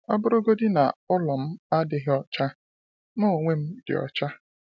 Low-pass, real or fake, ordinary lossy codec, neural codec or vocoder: none; real; none; none